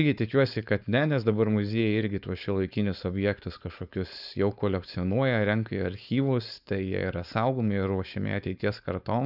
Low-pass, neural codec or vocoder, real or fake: 5.4 kHz; codec, 16 kHz, 4.8 kbps, FACodec; fake